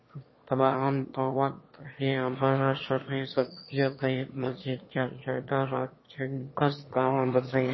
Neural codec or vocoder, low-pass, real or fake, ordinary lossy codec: autoencoder, 22.05 kHz, a latent of 192 numbers a frame, VITS, trained on one speaker; 7.2 kHz; fake; MP3, 24 kbps